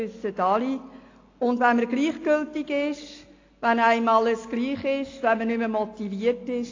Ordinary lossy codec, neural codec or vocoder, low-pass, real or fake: AAC, 32 kbps; none; 7.2 kHz; real